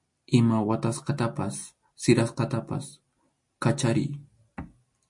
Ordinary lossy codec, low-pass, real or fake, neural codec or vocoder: MP3, 48 kbps; 10.8 kHz; real; none